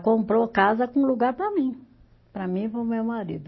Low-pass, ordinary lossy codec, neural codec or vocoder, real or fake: 7.2 kHz; MP3, 24 kbps; none; real